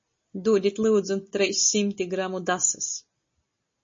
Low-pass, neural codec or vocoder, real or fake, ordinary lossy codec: 7.2 kHz; none; real; MP3, 32 kbps